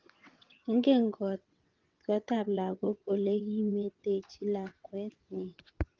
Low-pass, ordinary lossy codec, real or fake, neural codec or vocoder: 7.2 kHz; Opus, 32 kbps; fake; vocoder, 22.05 kHz, 80 mel bands, WaveNeXt